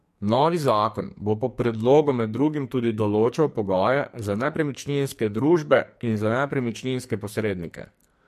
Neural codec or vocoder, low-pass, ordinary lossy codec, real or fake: codec, 44.1 kHz, 2.6 kbps, SNAC; 14.4 kHz; MP3, 64 kbps; fake